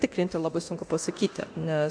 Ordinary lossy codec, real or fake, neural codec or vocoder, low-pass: AAC, 64 kbps; fake; codec, 24 kHz, 0.9 kbps, DualCodec; 9.9 kHz